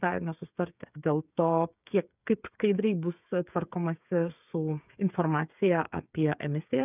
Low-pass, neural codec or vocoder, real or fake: 3.6 kHz; codec, 16 kHz, 8 kbps, FreqCodec, smaller model; fake